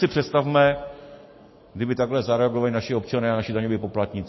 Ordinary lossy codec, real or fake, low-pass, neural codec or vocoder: MP3, 24 kbps; real; 7.2 kHz; none